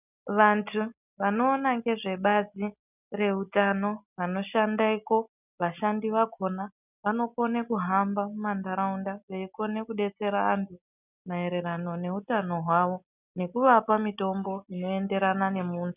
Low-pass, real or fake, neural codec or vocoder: 3.6 kHz; real; none